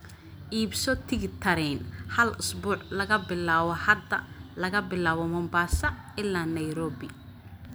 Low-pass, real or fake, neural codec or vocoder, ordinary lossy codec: none; real; none; none